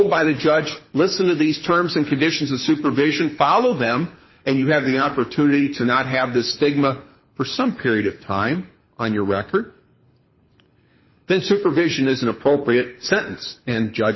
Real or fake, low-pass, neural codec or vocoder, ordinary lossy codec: fake; 7.2 kHz; codec, 24 kHz, 6 kbps, HILCodec; MP3, 24 kbps